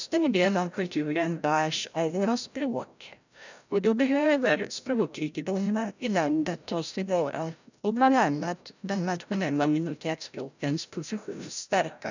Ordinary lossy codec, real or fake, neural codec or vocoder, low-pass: none; fake; codec, 16 kHz, 0.5 kbps, FreqCodec, larger model; 7.2 kHz